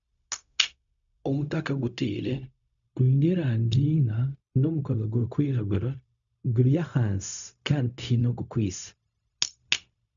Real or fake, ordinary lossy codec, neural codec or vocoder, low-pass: fake; none; codec, 16 kHz, 0.4 kbps, LongCat-Audio-Codec; 7.2 kHz